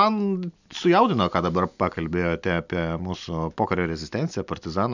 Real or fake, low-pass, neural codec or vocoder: real; 7.2 kHz; none